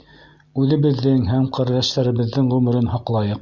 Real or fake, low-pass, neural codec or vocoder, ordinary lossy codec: real; 7.2 kHz; none; Opus, 64 kbps